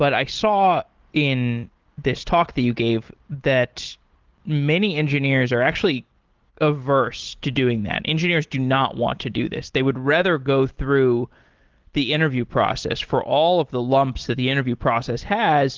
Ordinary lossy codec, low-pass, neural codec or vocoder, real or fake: Opus, 16 kbps; 7.2 kHz; none; real